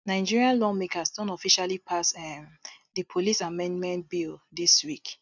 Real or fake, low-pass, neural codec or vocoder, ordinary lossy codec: real; 7.2 kHz; none; none